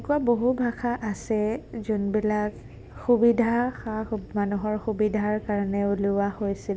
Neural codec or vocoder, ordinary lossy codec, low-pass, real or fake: none; none; none; real